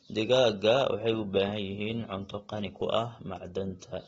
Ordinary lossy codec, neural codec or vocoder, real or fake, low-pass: AAC, 24 kbps; none; real; 19.8 kHz